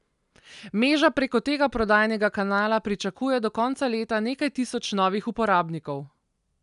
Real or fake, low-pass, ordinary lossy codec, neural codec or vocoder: real; 10.8 kHz; none; none